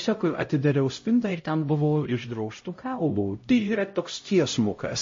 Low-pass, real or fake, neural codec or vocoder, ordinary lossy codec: 7.2 kHz; fake; codec, 16 kHz, 0.5 kbps, X-Codec, HuBERT features, trained on LibriSpeech; MP3, 32 kbps